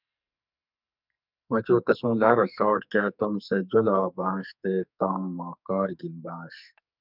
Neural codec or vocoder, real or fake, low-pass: codec, 44.1 kHz, 2.6 kbps, SNAC; fake; 5.4 kHz